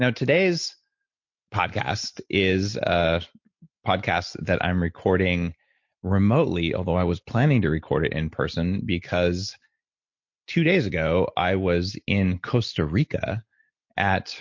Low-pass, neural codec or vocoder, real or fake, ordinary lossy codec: 7.2 kHz; none; real; MP3, 48 kbps